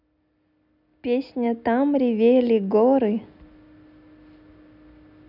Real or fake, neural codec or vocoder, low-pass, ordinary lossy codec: real; none; 5.4 kHz; none